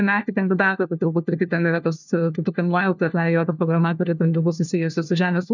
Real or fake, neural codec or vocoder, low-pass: fake; codec, 16 kHz, 1 kbps, FunCodec, trained on LibriTTS, 50 frames a second; 7.2 kHz